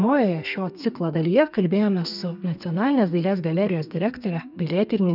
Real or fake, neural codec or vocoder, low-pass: fake; codec, 32 kHz, 1.9 kbps, SNAC; 5.4 kHz